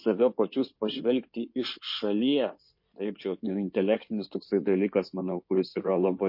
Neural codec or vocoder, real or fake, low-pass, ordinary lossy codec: codec, 16 kHz in and 24 kHz out, 2.2 kbps, FireRedTTS-2 codec; fake; 5.4 kHz; MP3, 32 kbps